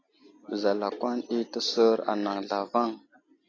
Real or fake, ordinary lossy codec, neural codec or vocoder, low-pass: real; AAC, 32 kbps; none; 7.2 kHz